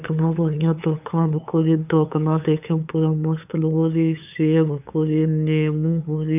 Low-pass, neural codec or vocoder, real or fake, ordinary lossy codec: 3.6 kHz; codec, 16 kHz, 8 kbps, FunCodec, trained on LibriTTS, 25 frames a second; fake; none